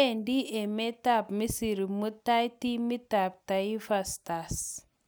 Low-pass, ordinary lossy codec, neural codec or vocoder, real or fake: none; none; none; real